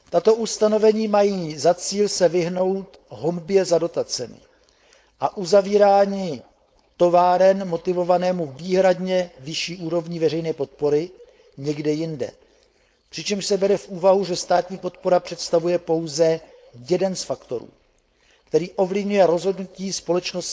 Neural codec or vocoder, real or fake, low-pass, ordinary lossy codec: codec, 16 kHz, 4.8 kbps, FACodec; fake; none; none